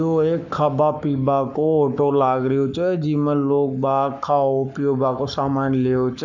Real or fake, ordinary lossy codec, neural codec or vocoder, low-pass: fake; none; codec, 44.1 kHz, 7.8 kbps, Pupu-Codec; 7.2 kHz